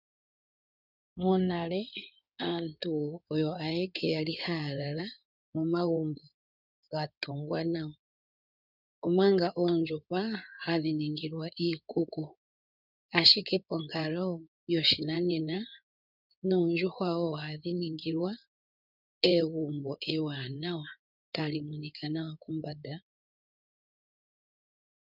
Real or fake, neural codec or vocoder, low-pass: fake; codec, 16 kHz in and 24 kHz out, 2.2 kbps, FireRedTTS-2 codec; 5.4 kHz